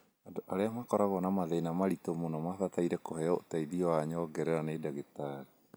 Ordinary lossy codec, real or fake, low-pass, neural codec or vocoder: none; real; none; none